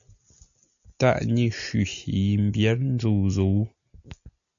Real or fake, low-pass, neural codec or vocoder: real; 7.2 kHz; none